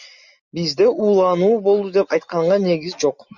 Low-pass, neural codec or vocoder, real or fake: 7.2 kHz; none; real